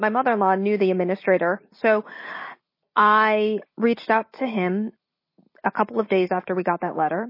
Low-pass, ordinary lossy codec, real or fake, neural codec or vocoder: 5.4 kHz; MP3, 24 kbps; real; none